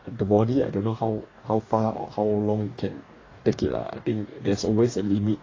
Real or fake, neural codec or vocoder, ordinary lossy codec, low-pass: fake; codec, 44.1 kHz, 2.6 kbps, DAC; AAC, 32 kbps; 7.2 kHz